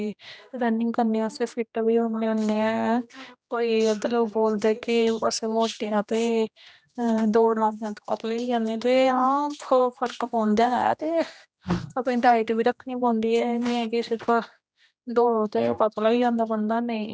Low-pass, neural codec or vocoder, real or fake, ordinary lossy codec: none; codec, 16 kHz, 1 kbps, X-Codec, HuBERT features, trained on general audio; fake; none